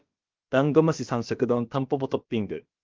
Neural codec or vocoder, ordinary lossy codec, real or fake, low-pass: codec, 16 kHz, about 1 kbps, DyCAST, with the encoder's durations; Opus, 32 kbps; fake; 7.2 kHz